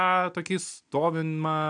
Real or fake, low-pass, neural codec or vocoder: real; 9.9 kHz; none